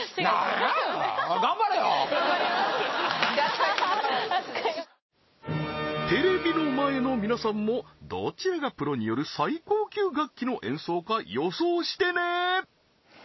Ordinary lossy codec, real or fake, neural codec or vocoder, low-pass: MP3, 24 kbps; real; none; 7.2 kHz